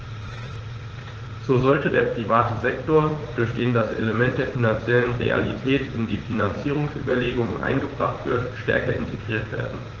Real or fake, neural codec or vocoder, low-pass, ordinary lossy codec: fake; vocoder, 44.1 kHz, 80 mel bands, Vocos; 7.2 kHz; Opus, 16 kbps